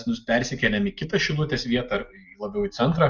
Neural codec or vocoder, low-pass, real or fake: none; 7.2 kHz; real